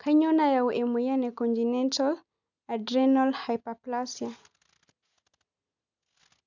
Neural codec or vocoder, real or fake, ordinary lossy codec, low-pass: none; real; none; 7.2 kHz